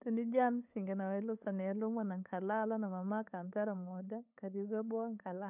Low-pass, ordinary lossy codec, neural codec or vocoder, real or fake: 3.6 kHz; none; codec, 16 kHz, 8 kbps, FunCodec, trained on LibriTTS, 25 frames a second; fake